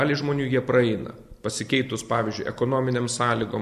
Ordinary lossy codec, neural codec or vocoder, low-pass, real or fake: AAC, 96 kbps; none; 14.4 kHz; real